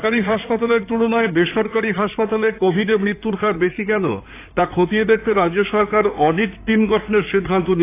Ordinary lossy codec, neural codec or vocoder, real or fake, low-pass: AAC, 24 kbps; codec, 16 kHz in and 24 kHz out, 2.2 kbps, FireRedTTS-2 codec; fake; 3.6 kHz